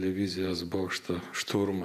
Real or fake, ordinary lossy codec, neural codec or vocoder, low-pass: fake; MP3, 96 kbps; vocoder, 48 kHz, 128 mel bands, Vocos; 14.4 kHz